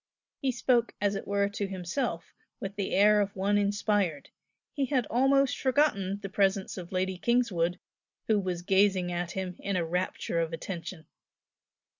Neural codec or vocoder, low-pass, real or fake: none; 7.2 kHz; real